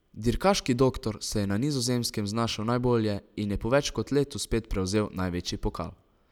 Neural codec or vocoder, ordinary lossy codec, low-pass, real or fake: none; none; 19.8 kHz; real